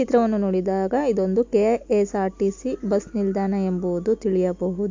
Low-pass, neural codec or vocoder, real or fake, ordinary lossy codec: 7.2 kHz; none; real; AAC, 48 kbps